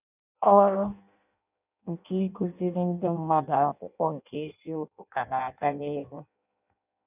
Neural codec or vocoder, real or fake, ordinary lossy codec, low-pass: codec, 16 kHz in and 24 kHz out, 0.6 kbps, FireRedTTS-2 codec; fake; none; 3.6 kHz